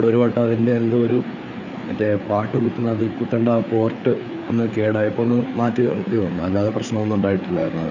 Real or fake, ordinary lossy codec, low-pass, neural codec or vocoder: fake; none; 7.2 kHz; codec, 16 kHz, 4 kbps, FreqCodec, larger model